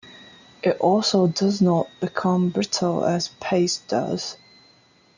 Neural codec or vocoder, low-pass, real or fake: none; 7.2 kHz; real